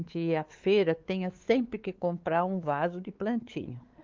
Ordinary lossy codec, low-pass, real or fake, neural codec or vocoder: Opus, 32 kbps; 7.2 kHz; fake; codec, 16 kHz, 4 kbps, X-Codec, WavLM features, trained on Multilingual LibriSpeech